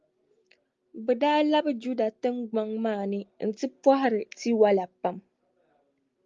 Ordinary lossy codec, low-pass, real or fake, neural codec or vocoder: Opus, 32 kbps; 7.2 kHz; real; none